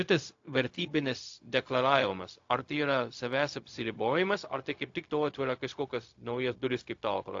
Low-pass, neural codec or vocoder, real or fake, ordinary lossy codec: 7.2 kHz; codec, 16 kHz, 0.4 kbps, LongCat-Audio-Codec; fake; AAC, 48 kbps